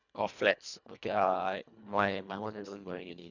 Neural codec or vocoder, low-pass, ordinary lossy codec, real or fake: codec, 24 kHz, 1.5 kbps, HILCodec; 7.2 kHz; none; fake